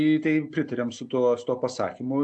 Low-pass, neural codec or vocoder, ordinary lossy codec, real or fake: 9.9 kHz; none; AAC, 64 kbps; real